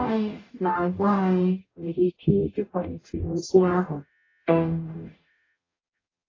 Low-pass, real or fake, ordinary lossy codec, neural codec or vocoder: 7.2 kHz; fake; AAC, 32 kbps; codec, 44.1 kHz, 0.9 kbps, DAC